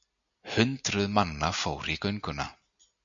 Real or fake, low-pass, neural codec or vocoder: real; 7.2 kHz; none